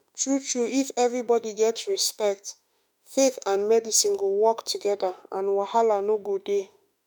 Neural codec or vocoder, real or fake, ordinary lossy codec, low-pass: autoencoder, 48 kHz, 32 numbers a frame, DAC-VAE, trained on Japanese speech; fake; none; none